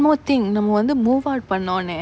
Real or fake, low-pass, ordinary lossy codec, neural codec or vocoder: real; none; none; none